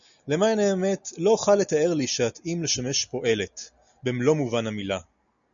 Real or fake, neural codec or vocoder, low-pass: real; none; 7.2 kHz